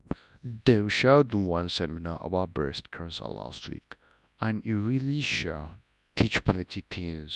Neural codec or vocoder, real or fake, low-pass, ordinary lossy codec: codec, 24 kHz, 0.9 kbps, WavTokenizer, large speech release; fake; 10.8 kHz; none